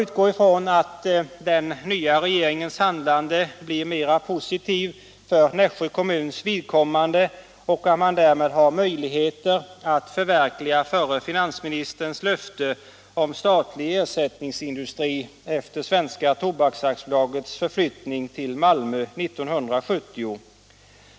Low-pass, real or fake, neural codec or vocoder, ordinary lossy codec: none; real; none; none